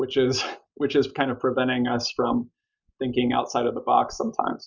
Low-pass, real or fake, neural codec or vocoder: 7.2 kHz; real; none